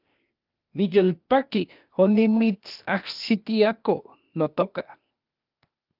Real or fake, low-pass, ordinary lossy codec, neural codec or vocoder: fake; 5.4 kHz; Opus, 24 kbps; codec, 16 kHz, 0.8 kbps, ZipCodec